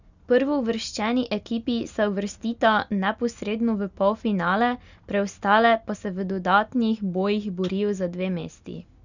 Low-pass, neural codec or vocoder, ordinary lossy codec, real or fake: 7.2 kHz; none; none; real